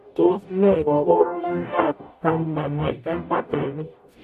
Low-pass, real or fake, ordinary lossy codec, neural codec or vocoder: 14.4 kHz; fake; MP3, 64 kbps; codec, 44.1 kHz, 0.9 kbps, DAC